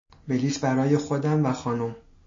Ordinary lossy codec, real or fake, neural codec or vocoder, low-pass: MP3, 48 kbps; real; none; 7.2 kHz